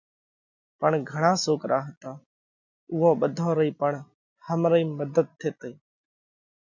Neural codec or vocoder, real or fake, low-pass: none; real; 7.2 kHz